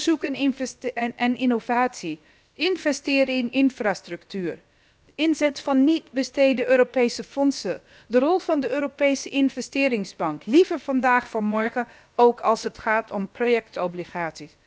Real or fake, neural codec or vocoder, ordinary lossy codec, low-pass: fake; codec, 16 kHz, about 1 kbps, DyCAST, with the encoder's durations; none; none